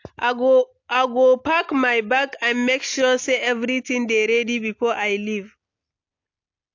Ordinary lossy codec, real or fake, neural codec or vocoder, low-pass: AAC, 48 kbps; real; none; 7.2 kHz